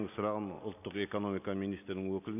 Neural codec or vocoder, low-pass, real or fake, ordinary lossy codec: none; 3.6 kHz; real; none